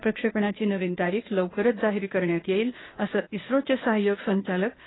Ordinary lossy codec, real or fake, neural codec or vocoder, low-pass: AAC, 16 kbps; fake; codec, 16 kHz, 0.8 kbps, ZipCodec; 7.2 kHz